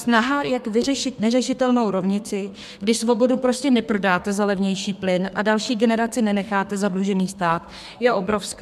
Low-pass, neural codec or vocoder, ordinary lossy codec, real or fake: 14.4 kHz; codec, 32 kHz, 1.9 kbps, SNAC; MP3, 96 kbps; fake